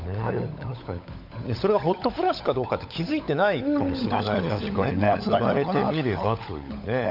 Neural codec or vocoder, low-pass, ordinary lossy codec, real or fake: codec, 16 kHz, 16 kbps, FunCodec, trained on LibriTTS, 50 frames a second; 5.4 kHz; none; fake